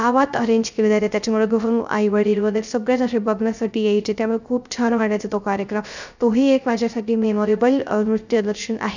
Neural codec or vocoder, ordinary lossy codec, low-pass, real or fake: codec, 16 kHz, 0.3 kbps, FocalCodec; none; 7.2 kHz; fake